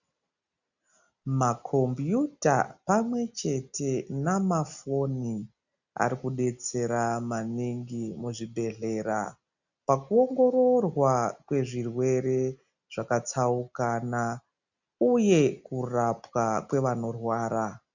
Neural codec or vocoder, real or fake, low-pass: none; real; 7.2 kHz